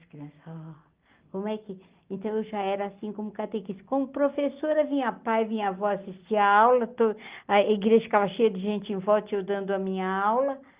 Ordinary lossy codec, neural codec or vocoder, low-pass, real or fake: Opus, 32 kbps; none; 3.6 kHz; real